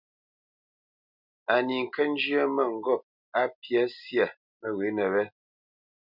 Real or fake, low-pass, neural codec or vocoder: real; 5.4 kHz; none